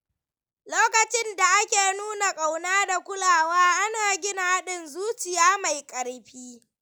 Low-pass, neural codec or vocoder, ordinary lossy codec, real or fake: none; none; none; real